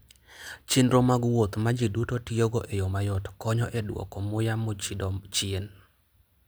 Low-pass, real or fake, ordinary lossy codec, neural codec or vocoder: none; real; none; none